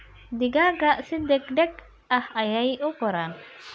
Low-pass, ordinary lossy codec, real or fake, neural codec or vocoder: none; none; real; none